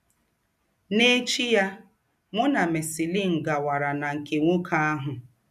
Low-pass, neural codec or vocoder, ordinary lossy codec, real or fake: 14.4 kHz; none; none; real